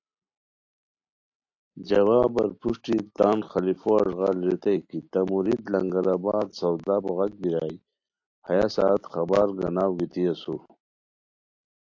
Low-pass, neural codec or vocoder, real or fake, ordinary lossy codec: 7.2 kHz; none; real; AAC, 48 kbps